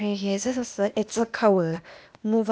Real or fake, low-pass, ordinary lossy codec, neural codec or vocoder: fake; none; none; codec, 16 kHz, 0.8 kbps, ZipCodec